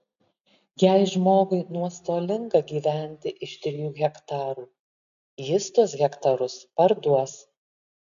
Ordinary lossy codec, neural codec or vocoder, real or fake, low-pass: AAC, 96 kbps; none; real; 7.2 kHz